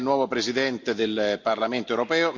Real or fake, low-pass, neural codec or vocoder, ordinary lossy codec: real; 7.2 kHz; none; none